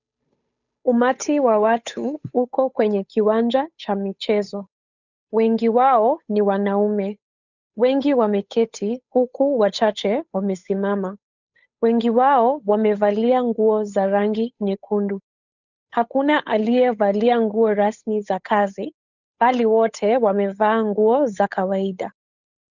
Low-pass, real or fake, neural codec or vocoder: 7.2 kHz; fake; codec, 16 kHz, 8 kbps, FunCodec, trained on Chinese and English, 25 frames a second